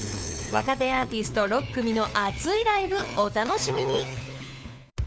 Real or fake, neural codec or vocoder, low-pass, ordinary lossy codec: fake; codec, 16 kHz, 4 kbps, FunCodec, trained on LibriTTS, 50 frames a second; none; none